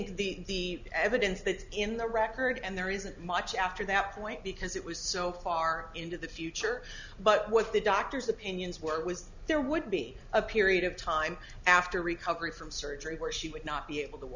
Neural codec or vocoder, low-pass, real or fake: none; 7.2 kHz; real